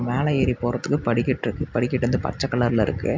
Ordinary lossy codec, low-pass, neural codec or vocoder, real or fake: MP3, 64 kbps; 7.2 kHz; none; real